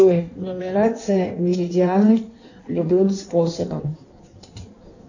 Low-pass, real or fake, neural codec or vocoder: 7.2 kHz; fake; codec, 16 kHz in and 24 kHz out, 1.1 kbps, FireRedTTS-2 codec